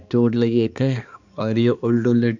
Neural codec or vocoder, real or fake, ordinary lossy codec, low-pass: codec, 16 kHz, 2 kbps, X-Codec, HuBERT features, trained on balanced general audio; fake; none; 7.2 kHz